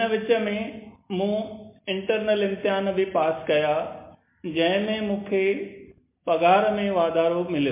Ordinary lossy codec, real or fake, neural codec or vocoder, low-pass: MP3, 24 kbps; real; none; 3.6 kHz